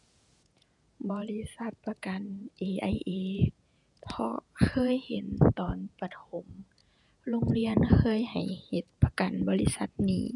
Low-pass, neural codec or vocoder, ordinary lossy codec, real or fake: 10.8 kHz; vocoder, 48 kHz, 128 mel bands, Vocos; none; fake